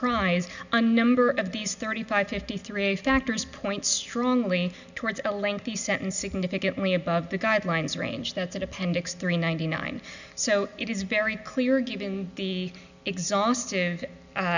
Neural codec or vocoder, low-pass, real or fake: none; 7.2 kHz; real